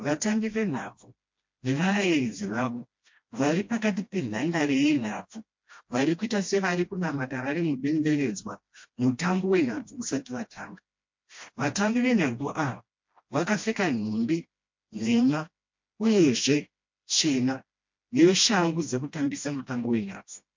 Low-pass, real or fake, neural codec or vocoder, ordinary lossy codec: 7.2 kHz; fake; codec, 16 kHz, 1 kbps, FreqCodec, smaller model; MP3, 48 kbps